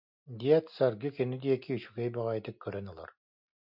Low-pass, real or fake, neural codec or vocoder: 5.4 kHz; real; none